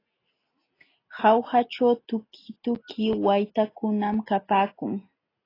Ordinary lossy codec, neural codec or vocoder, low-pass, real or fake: AAC, 24 kbps; none; 5.4 kHz; real